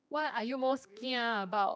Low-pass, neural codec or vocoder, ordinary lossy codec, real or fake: none; codec, 16 kHz, 2 kbps, X-Codec, HuBERT features, trained on general audio; none; fake